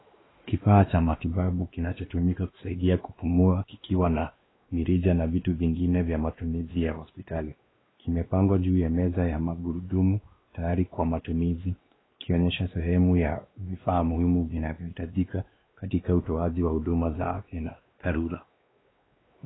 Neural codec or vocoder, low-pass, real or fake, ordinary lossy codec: codec, 16 kHz, 2 kbps, X-Codec, WavLM features, trained on Multilingual LibriSpeech; 7.2 kHz; fake; AAC, 16 kbps